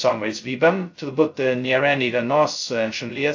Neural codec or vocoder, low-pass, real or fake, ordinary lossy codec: codec, 16 kHz, 0.2 kbps, FocalCodec; 7.2 kHz; fake; AAC, 48 kbps